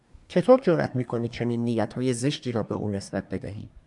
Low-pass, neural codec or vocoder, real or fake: 10.8 kHz; codec, 24 kHz, 1 kbps, SNAC; fake